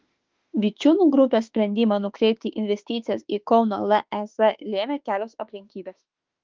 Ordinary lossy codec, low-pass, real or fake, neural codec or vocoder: Opus, 32 kbps; 7.2 kHz; fake; autoencoder, 48 kHz, 32 numbers a frame, DAC-VAE, trained on Japanese speech